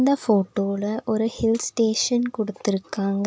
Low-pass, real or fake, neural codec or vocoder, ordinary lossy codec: none; real; none; none